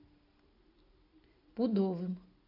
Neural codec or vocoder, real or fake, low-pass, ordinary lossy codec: none; real; 5.4 kHz; AAC, 32 kbps